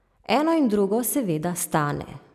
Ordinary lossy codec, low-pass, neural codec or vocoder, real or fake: none; 14.4 kHz; none; real